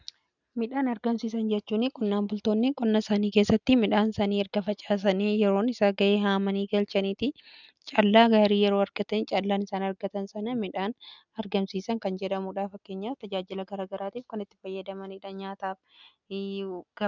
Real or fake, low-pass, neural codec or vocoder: real; 7.2 kHz; none